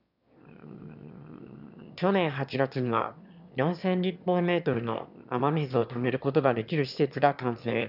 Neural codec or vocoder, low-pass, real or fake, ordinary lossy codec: autoencoder, 22.05 kHz, a latent of 192 numbers a frame, VITS, trained on one speaker; 5.4 kHz; fake; none